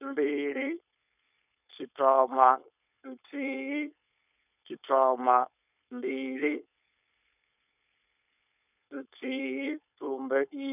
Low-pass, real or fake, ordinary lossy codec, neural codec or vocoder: 3.6 kHz; fake; none; codec, 16 kHz, 4.8 kbps, FACodec